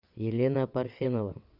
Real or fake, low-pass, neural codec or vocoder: fake; 5.4 kHz; vocoder, 22.05 kHz, 80 mel bands, WaveNeXt